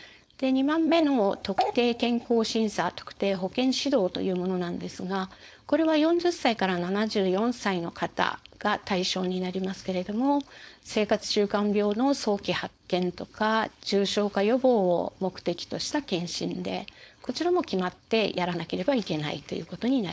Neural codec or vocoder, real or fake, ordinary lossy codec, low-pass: codec, 16 kHz, 4.8 kbps, FACodec; fake; none; none